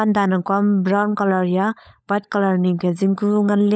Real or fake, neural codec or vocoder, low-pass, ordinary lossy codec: fake; codec, 16 kHz, 16 kbps, FunCodec, trained on LibriTTS, 50 frames a second; none; none